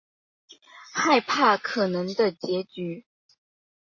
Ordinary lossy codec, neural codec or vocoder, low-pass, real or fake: MP3, 32 kbps; none; 7.2 kHz; real